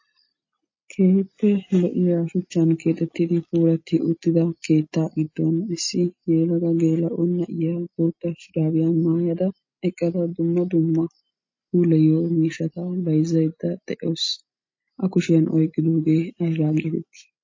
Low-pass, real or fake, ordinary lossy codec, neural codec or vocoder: 7.2 kHz; real; MP3, 32 kbps; none